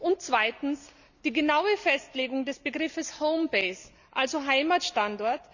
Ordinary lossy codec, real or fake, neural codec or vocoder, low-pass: none; real; none; 7.2 kHz